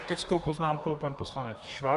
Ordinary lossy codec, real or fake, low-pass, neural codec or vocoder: Opus, 64 kbps; fake; 10.8 kHz; codec, 24 kHz, 1 kbps, SNAC